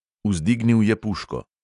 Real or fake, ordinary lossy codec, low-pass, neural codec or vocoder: real; AAC, 64 kbps; 10.8 kHz; none